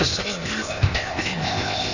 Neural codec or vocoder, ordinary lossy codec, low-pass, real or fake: codec, 16 kHz, 0.8 kbps, ZipCodec; none; 7.2 kHz; fake